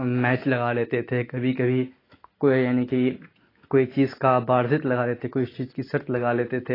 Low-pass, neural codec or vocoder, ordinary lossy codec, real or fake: 5.4 kHz; none; AAC, 24 kbps; real